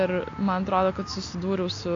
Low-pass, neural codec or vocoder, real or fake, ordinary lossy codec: 7.2 kHz; none; real; AAC, 48 kbps